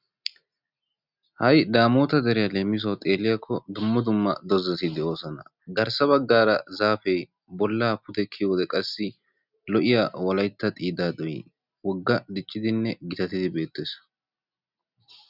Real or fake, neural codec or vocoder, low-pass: real; none; 5.4 kHz